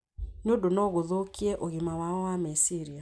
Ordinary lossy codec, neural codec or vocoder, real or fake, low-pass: none; none; real; none